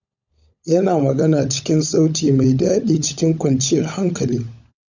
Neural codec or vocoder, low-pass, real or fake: codec, 16 kHz, 16 kbps, FunCodec, trained on LibriTTS, 50 frames a second; 7.2 kHz; fake